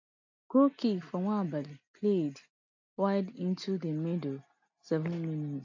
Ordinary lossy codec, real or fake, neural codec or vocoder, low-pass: AAC, 48 kbps; real; none; 7.2 kHz